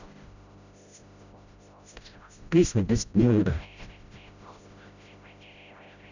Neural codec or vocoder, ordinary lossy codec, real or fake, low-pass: codec, 16 kHz, 0.5 kbps, FreqCodec, smaller model; none; fake; 7.2 kHz